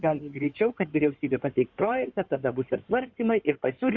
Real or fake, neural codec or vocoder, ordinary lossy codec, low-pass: fake; codec, 16 kHz in and 24 kHz out, 2.2 kbps, FireRedTTS-2 codec; Opus, 64 kbps; 7.2 kHz